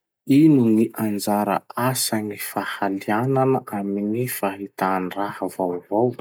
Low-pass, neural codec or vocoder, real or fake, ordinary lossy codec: none; none; real; none